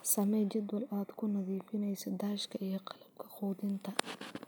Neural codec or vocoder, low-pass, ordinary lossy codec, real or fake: none; none; none; real